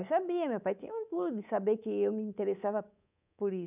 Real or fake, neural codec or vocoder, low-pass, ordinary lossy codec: fake; codec, 24 kHz, 3.1 kbps, DualCodec; 3.6 kHz; none